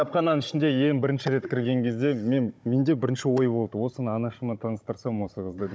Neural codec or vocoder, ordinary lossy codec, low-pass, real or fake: codec, 16 kHz, 16 kbps, FunCodec, trained on Chinese and English, 50 frames a second; none; none; fake